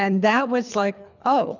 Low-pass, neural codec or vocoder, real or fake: 7.2 kHz; codec, 24 kHz, 3 kbps, HILCodec; fake